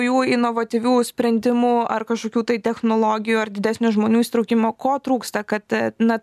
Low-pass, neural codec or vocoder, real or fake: 14.4 kHz; none; real